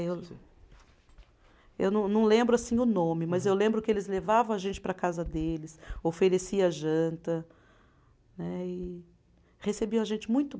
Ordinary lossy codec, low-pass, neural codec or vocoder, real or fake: none; none; none; real